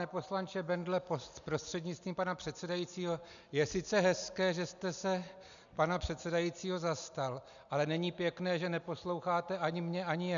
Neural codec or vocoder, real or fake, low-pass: none; real; 7.2 kHz